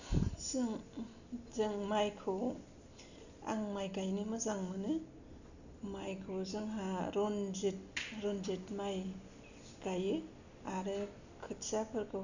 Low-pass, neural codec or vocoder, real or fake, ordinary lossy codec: 7.2 kHz; none; real; none